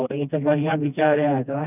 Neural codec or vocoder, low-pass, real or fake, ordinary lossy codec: codec, 16 kHz, 1 kbps, FreqCodec, smaller model; 3.6 kHz; fake; none